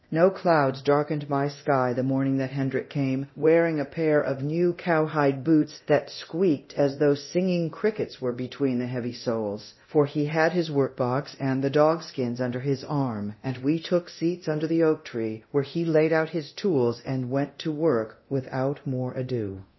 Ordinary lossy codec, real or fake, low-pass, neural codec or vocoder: MP3, 24 kbps; fake; 7.2 kHz; codec, 24 kHz, 0.9 kbps, DualCodec